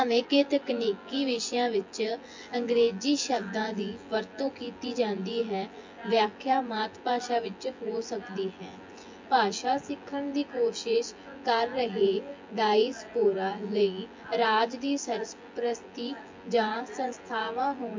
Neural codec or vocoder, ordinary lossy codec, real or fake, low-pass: vocoder, 24 kHz, 100 mel bands, Vocos; MP3, 48 kbps; fake; 7.2 kHz